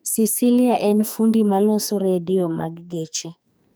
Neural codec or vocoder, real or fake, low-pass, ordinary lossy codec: codec, 44.1 kHz, 2.6 kbps, SNAC; fake; none; none